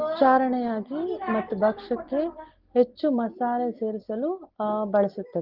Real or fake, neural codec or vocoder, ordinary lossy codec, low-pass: real; none; Opus, 16 kbps; 5.4 kHz